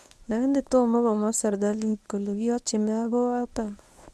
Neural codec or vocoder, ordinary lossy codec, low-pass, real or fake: codec, 24 kHz, 0.9 kbps, WavTokenizer, medium speech release version 1; none; none; fake